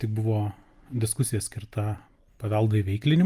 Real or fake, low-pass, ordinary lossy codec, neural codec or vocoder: real; 14.4 kHz; Opus, 32 kbps; none